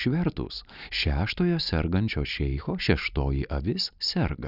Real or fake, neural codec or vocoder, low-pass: real; none; 5.4 kHz